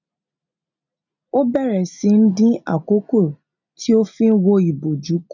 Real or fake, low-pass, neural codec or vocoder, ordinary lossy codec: real; 7.2 kHz; none; none